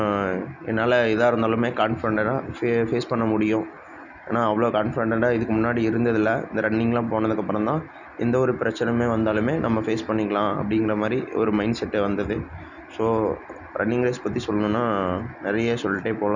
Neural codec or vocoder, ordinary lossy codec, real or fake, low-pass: none; none; real; 7.2 kHz